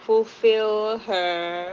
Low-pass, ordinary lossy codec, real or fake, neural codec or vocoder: 7.2 kHz; Opus, 16 kbps; real; none